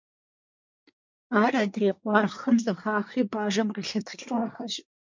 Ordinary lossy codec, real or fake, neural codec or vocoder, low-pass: MP3, 64 kbps; fake; codec, 24 kHz, 1 kbps, SNAC; 7.2 kHz